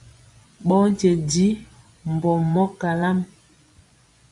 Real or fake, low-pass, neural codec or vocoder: fake; 10.8 kHz; vocoder, 24 kHz, 100 mel bands, Vocos